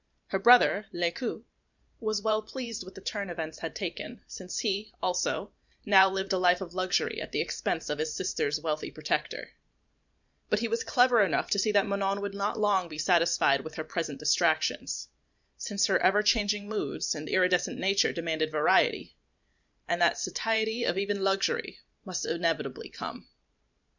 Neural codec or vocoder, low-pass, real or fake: vocoder, 44.1 kHz, 128 mel bands every 512 samples, BigVGAN v2; 7.2 kHz; fake